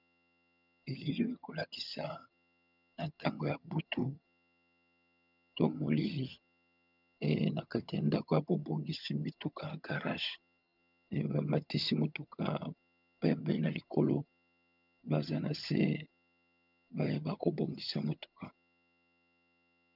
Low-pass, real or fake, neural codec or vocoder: 5.4 kHz; fake; vocoder, 22.05 kHz, 80 mel bands, HiFi-GAN